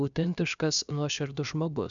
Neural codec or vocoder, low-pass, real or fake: codec, 16 kHz, about 1 kbps, DyCAST, with the encoder's durations; 7.2 kHz; fake